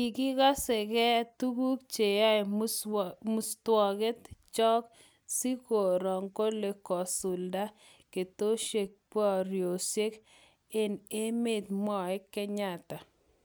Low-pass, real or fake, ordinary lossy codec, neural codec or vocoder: none; real; none; none